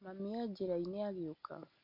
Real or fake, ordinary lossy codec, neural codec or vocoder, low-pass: real; none; none; 5.4 kHz